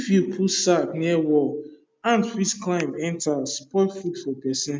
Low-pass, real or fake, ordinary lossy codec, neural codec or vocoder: none; real; none; none